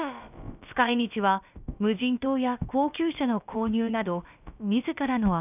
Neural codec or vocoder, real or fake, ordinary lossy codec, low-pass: codec, 16 kHz, about 1 kbps, DyCAST, with the encoder's durations; fake; none; 3.6 kHz